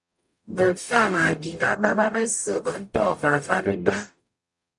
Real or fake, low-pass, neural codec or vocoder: fake; 10.8 kHz; codec, 44.1 kHz, 0.9 kbps, DAC